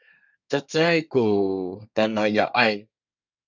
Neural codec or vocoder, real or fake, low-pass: codec, 24 kHz, 1 kbps, SNAC; fake; 7.2 kHz